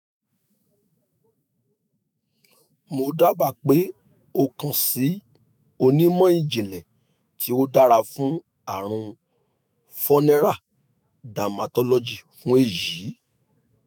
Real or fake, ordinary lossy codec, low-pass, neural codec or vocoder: fake; none; none; autoencoder, 48 kHz, 128 numbers a frame, DAC-VAE, trained on Japanese speech